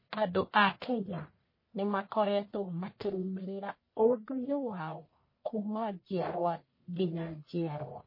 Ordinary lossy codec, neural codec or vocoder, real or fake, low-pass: MP3, 24 kbps; codec, 44.1 kHz, 1.7 kbps, Pupu-Codec; fake; 5.4 kHz